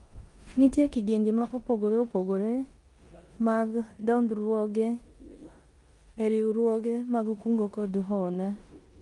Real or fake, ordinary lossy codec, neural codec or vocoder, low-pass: fake; Opus, 32 kbps; codec, 16 kHz in and 24 kHz out, 0.9 kbps, LongCat-Audio-Codec, four codebook decoder; 10.8 kHz